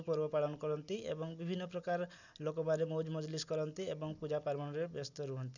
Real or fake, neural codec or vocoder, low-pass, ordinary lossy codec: real; none; 7.2 kHz; none